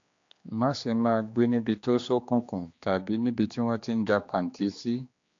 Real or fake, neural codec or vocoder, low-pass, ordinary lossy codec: fake; codec, 16 kHz, 2 kbps, X-Codec, HuBERT features, trained on general audio; 7.2 kHz; AAC, 48 kbps